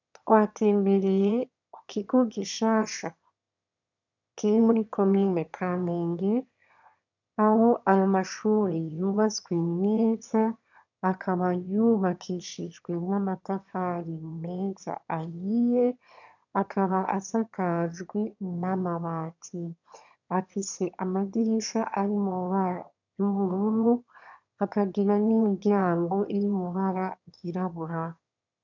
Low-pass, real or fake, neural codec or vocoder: 7.2 kHz; fake; autoencoder, 22.05 kHz, a latent of 192 numbers a frame, VITS, trained on one speaker